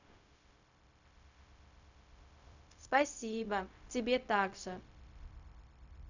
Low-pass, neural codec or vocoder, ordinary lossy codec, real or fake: 7.2 kHz; codec, 16 kHz, 0.4 kbps, LongCat-Audio-Codec; none; fake